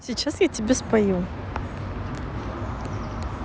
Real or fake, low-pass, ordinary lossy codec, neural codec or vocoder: real; none; none; none